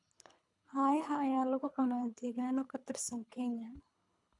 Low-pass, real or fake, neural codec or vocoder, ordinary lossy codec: 10.8 kHz; fake; codec, 24 kHz, 3 kbps, HILCodec; none